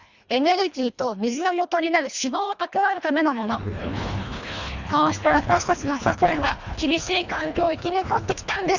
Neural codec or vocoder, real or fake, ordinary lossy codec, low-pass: codec, 24 kHz, 1.5 kbps, HILCodec; fake; Opus, 64 kbps; 7.2 kHz